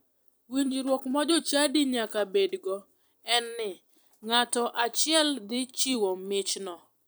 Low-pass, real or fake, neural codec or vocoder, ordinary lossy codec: none; fake; vocoder, 44.1 kHz, 128 mel bands every 256 samples, BigVGAN v2; none